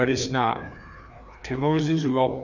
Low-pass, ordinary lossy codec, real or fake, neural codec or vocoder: 7.2 kHz; none; fake; codec, 16 kHz, 2 kbps, FreqCodec, larger model